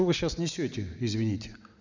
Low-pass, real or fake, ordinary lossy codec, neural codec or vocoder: 7.2 kHz; real; none; none